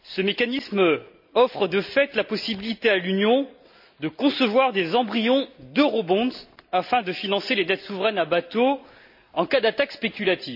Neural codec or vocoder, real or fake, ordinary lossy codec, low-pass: none; real; AAC, 48 kbps; 5.4 kHz